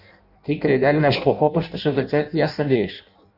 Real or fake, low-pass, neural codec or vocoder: fake; 5.4 kHz; codec, 16 kHz in and 24 kHz out, 0.6 kbps, FireRedTTS-2 codec